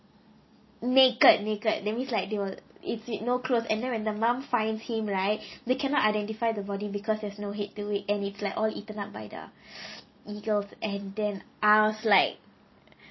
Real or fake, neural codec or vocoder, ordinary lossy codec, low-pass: real; none; MP3, 24 kbps; 7.2 kHz